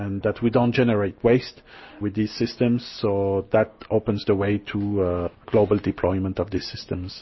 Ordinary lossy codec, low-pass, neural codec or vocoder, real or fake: MP3, 24 kbps; 7.2 kHz; none; real